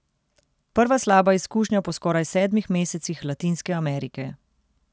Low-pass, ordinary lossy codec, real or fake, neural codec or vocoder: none; none; real; none